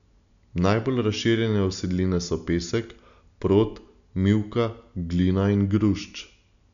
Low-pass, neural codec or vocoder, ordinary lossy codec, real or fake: 7.2 kHz; none; none; real